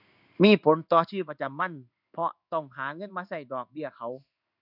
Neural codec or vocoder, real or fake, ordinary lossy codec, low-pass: codec, 16 kHz in and 24 kHz out, 1 kbps, XY-Tokenizer; fake; none; 5.4 kHz